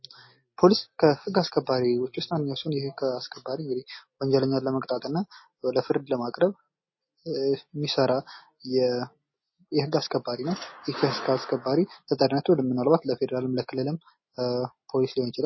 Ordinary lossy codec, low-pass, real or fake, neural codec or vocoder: MP3, 24 kbps; 7.2 kHz; real; none